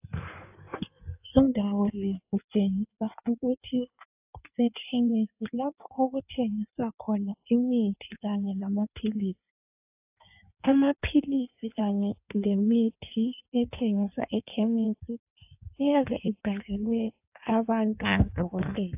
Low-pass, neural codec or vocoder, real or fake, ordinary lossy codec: 3.6 kHz; codec, 16 kHz in and 24 kHz out, 1.1 kbps, FireRedTTS-2 codec; fake; AAC, 32 kbps